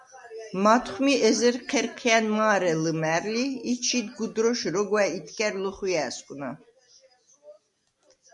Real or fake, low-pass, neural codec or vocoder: real; 10.8 kHz; none